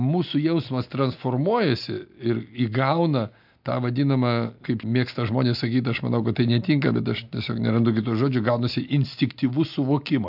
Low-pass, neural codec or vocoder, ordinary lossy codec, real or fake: 5.4 kHz; none; AAC, 48 kbps; real